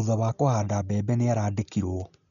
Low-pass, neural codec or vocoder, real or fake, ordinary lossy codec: 7.2 kHz; none; real; none